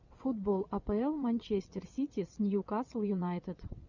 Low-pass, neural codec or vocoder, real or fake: 7.2 kHz; vocoder, 22.05 kHz, 80 mel bands, WaveNeXt; fake